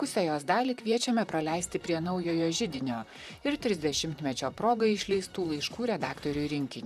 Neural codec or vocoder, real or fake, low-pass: vocoder, 44.1 kHz, 128 mel bands, Pupu-Vocoder; fake; 14.4 kHz